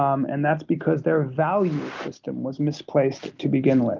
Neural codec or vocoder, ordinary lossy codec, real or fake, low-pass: none; Opus, 32 kbps; real; 7.2 kHz